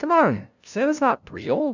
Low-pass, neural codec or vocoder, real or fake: 7.2 kHz; codec, 16 kHz, 0.5 kbps, FunCodec, trained on LibriTTS, 25 frames a second; fake